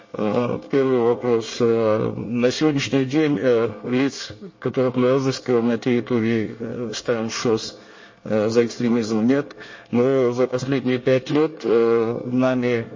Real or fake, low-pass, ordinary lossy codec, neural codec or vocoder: fake; 7.2 kHz; MP3, 32 kbps; codec, 24 kHz, 1 kbps, SNAC